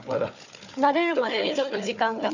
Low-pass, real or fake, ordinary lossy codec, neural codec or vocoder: 7.2 kHz; fake; none; codec, 16 kHz, 4 kbps, FunCodec, trained on Chinese and English, 50 frames a second